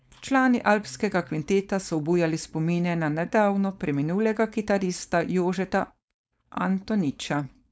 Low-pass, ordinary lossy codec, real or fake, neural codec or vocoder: none; none; fake; codec, 16 kHz, 4.8 kbps, FACodec